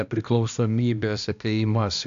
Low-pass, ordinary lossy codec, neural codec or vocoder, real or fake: 7.2 kHz; AAC, 96 kbps; codec, 16 kHz, 1 kbps, X-Codec, HuBERT features, trained on balanced general audio; fake